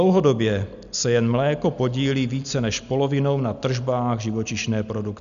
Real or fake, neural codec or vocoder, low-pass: real; none; 7.2 kHz